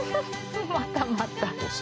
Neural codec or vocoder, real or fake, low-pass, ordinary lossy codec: none; real; none; none